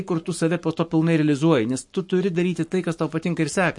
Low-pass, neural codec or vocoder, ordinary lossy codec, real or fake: 10.8 kHz; codec, 44.1 kHz, 7.8 kbps, Pupu-Codec; MP3, 48 kbps; fake